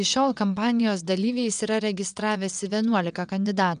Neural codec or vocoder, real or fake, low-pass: vocoder, 22.05 kHz, 80 mel bands, WaveNeXt; fake; 9.9 kHz